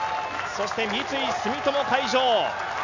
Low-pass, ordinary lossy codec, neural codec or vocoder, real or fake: 7.2 kHz; none; none; real